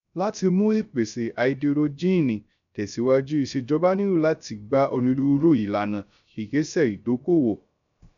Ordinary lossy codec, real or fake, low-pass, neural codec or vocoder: none; fake; 7.2 kHz; codec, 16 kHz, 0.3 kbps, FocalCodec